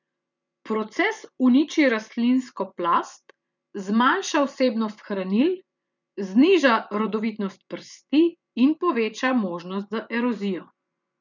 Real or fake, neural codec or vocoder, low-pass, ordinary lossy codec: fake; vocoder, 24 kHz, 100 mel bands, Vocos; 7.2 kHz; none